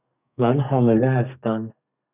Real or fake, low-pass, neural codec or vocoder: fake; 3.6 kHz; codec, 32 kHz, 1.9 kbps, SNAC